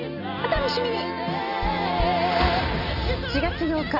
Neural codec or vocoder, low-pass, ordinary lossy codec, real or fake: none; 5.4 kHz; none; real